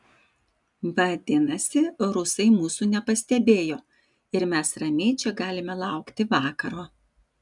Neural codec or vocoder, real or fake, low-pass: none; real; 10.8 kHz